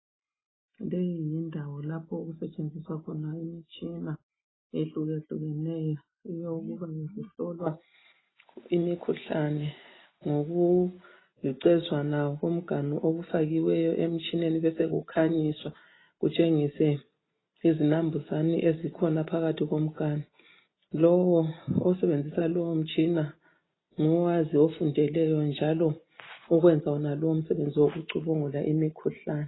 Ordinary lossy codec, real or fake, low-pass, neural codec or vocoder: AAC, 16 kbps; real; 7.2 kHz; none